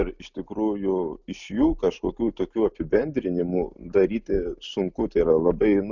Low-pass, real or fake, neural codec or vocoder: 7.2 kHz; real; none